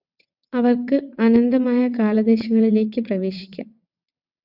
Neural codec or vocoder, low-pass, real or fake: vocoder, 22.05 kHz, 80 mel bands, WaveNeXt; 5.4 kHz; fake